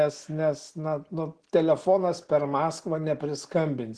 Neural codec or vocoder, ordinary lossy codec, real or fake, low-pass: none; Opus, 16 kbps; real; 10.8 kHz